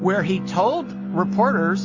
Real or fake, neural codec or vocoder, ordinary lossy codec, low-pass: real; none; MP3, 32 kbps; 7.2 kHz